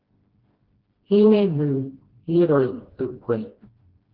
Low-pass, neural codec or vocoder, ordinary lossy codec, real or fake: 5.4 kHz; codec, 16 kHz, 1 kbps, FreqCodec, smaller model; Opus, 16 kbps; fake